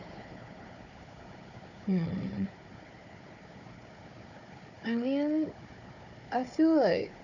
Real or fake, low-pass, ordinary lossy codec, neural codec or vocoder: fake; 7.2 kHz; none; codec, 16 kHz, 4 kbps, FunCodec, trained on Chinese and English, 50 frames a second